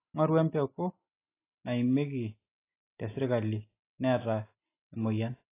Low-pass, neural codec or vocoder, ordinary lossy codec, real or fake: 3.6 kHz; none; AAC, 24 kbps; real